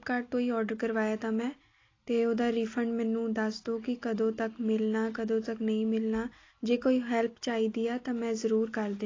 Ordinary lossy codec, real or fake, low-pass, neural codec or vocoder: AAC, 32 kbps; real; 7.2 kHz; none